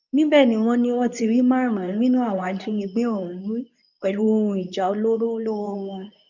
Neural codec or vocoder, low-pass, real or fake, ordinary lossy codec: codec, 24 kHz, 0.9 kbps, WavTokenizer, medium speech release version 2; 7.2 kHz; fake; none